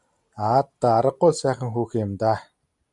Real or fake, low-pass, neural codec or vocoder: real; 10.8 kHz; none